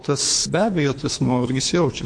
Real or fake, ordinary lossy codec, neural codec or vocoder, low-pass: fake; MP3, 48 kbps; codec, 24 kHz, 3 kbps, HILCodec; 9.9 kHz